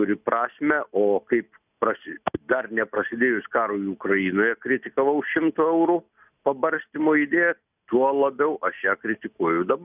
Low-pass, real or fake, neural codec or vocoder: 3.6 kHz; real; none